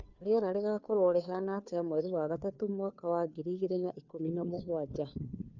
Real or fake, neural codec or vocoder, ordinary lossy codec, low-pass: fake; codec, 16 kHz, 2 kbps, FunCodec, trained on Chinese and English, 25 frames a second; none; 7.2 kHz